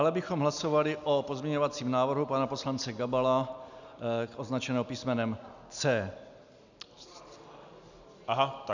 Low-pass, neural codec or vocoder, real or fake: 7.2 kHz; none; real